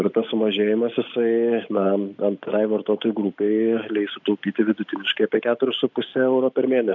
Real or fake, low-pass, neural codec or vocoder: real; 7.2 kHz; none